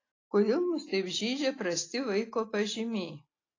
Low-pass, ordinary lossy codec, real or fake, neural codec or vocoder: 7.2 kHz; AAC, 32 kbps; real; none